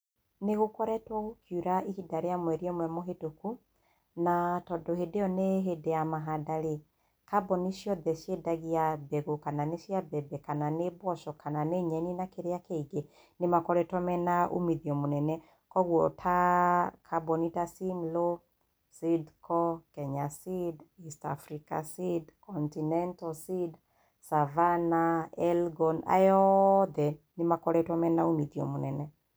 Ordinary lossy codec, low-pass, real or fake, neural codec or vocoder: none; none; real; none